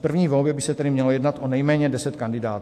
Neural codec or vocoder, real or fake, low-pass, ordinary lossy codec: autoencoder, 48 kHz, 128 numbers a frame, DAC-VAE, trained on Japanese speech; fake; 14.4 kHz; AAC, 64 kbps